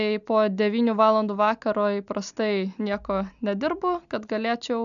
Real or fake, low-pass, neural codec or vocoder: real; 7.2 kHz; none